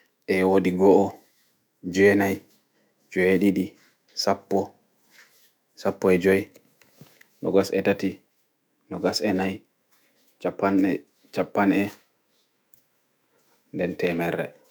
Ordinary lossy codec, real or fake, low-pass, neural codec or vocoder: none; fake; none; autoencoder, 48 kHz, 128 numbers a frame, DAC-VAE, trained on Japanese speech